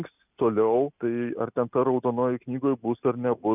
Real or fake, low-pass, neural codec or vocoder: real; 3.6 kHz; none